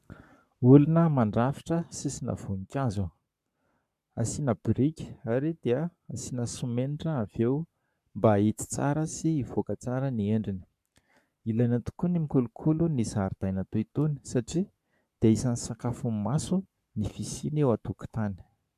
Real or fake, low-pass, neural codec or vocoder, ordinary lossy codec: fake; 14.4 kHz; codec, 44.1 kHz, 7.8 kbps, Pupu-Codec; MP3, 96 kbps